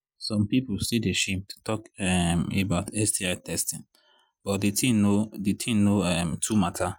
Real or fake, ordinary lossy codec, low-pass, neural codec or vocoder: real; none; 19.8 kHz; none